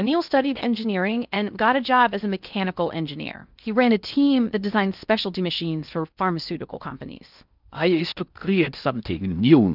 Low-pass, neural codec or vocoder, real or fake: 5.4 kHz; codec, 16 kHz in and 24 kHz out, 0.6 kbps, FocalCodec, streaming, 4096 codes; fake